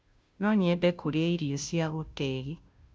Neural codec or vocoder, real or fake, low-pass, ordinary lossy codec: codec, 16 kHz, 0.5 kbps, FunCodec, trained on Chinese and English, 25 frames a second; fake; none; none